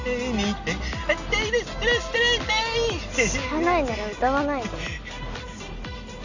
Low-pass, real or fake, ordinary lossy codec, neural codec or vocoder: 7.2 kHz; real; none; none